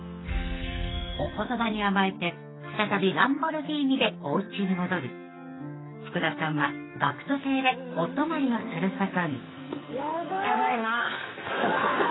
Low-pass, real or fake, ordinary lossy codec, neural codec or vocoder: 7.2 kHz; fake; AAC, 16 kbps; codec, 44.1 kHz, 2.6 kbps, SNAC